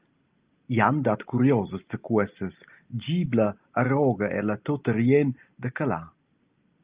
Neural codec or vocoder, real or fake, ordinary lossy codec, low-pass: none; real; Opus, 24 kbps; 3.6 kHz